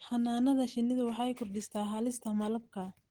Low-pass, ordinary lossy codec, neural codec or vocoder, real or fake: 19.8 kHz; Opus, 16 kbps; none; real